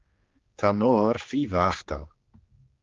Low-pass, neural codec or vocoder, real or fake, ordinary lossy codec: 7.2 kHz; codec, 16 kHz, 2 kbps, X-Codec, HuBERT features, trained on general audio; fake; Opus, 32 kbps